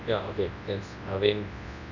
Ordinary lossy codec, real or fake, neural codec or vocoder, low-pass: none; fake; codec, 24 kHz, 0.9 kbps, WavTokenizer, large speech release; 7.2 kHz